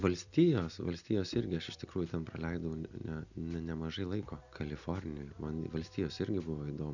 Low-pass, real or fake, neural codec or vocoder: 7.2 kHz; real; none